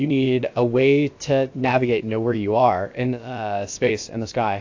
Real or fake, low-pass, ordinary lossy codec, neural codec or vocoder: fake; 7.2 kHz; AAC, 48 kbps; codec, 16 kHz, about 1 kbps, DyCAST, with the encoder's durations